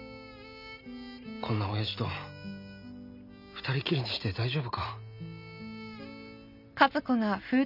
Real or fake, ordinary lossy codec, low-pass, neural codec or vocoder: real; none; 5.4 kHz; none